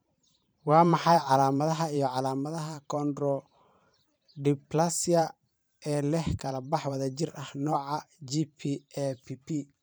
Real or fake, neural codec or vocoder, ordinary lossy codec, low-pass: fake; vocoder, 44.1 kHz, 128 mel bands every 512 samples, BigVGAN v2; none; none